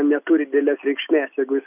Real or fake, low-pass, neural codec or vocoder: real; 3.6 kHz; none